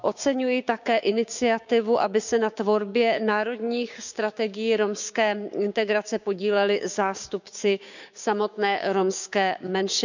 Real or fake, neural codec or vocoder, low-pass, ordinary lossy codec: fake; codec, 16 kHz, 6 kbps, DAC; 7.2 kHz; none